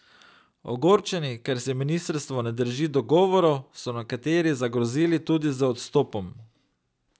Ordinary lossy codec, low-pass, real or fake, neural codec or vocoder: none; none; real; none